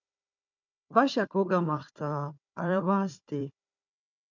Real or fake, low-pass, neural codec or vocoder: fake; 7.2 kHz; codec, 16 kHz, 4 kbps, FunCodec, trained on Chinese and English, 50 frames a second